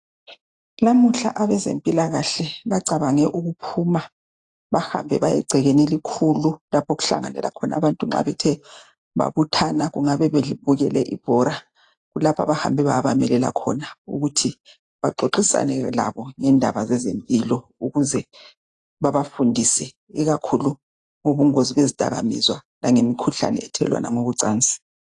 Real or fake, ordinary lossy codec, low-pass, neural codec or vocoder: real; AAC, 48 kbps; 10.8 kHz; none